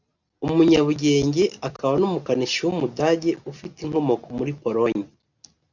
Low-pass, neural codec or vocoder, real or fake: 7.2 kHz; none; real